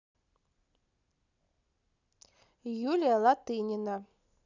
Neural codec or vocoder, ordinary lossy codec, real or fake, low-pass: none; none; real; 7.2 kHz